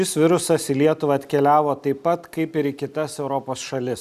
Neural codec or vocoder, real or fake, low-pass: none; real; 14.4 kHz